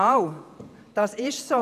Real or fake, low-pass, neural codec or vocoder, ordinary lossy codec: fake; 14.4 kHz; vocoder, 48 kHz, 128 mel bands, Vocos; none